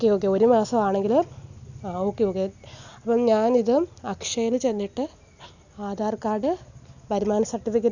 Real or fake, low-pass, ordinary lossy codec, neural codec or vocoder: real; 7.2 kHz; none; none